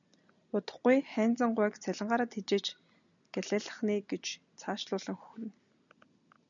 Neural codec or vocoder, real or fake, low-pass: none; real; 7.2 kHz